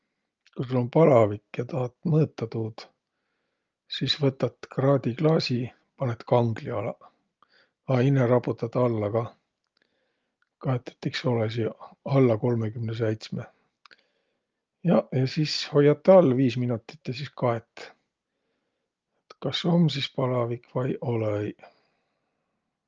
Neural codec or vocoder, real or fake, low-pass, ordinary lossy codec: none; real; 7.2 kHz; Opus, 24 kbps